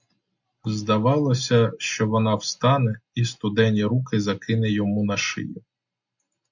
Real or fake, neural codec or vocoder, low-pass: real; none; 7.2 kHz